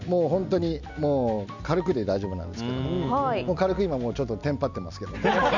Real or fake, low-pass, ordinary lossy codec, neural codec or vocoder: real; 7.2 kHz; none; none